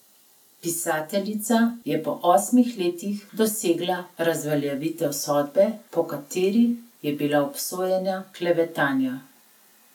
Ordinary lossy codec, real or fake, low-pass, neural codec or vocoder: none; real; 19.8 kHz; none